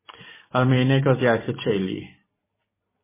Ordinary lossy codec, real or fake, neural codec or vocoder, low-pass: MP3, 16 kbps; fake; vocoder, 44.1 kHz, 128 mel bands every 256 samples, BigVGAN v2; 3.6 kHz